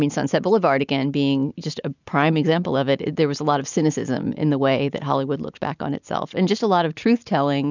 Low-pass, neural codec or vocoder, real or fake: 7.2 kHz; none; real